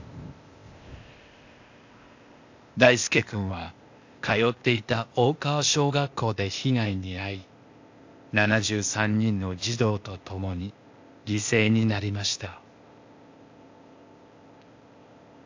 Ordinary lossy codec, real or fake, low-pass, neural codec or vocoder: AAC, 48 kbps; fake; 7.2 kHz; codec, 16 kHz, 0.8 kbps, ZipCodec